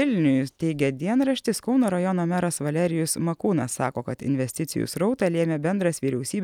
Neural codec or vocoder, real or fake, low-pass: none; real; 19.8 kHz